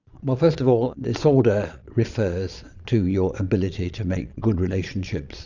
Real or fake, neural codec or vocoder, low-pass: fake; vocoder, 22.05 kHz, 80 mel bands, Vocos; 7.2 kHz